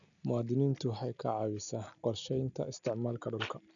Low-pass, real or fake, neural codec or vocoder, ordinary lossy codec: 7.2 kHz; real; none; none